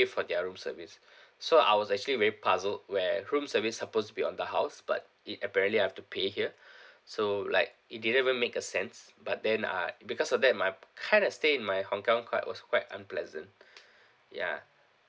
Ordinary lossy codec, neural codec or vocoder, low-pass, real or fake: none; none; none; real